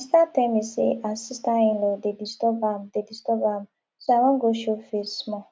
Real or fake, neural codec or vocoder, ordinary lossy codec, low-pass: real; none; none; none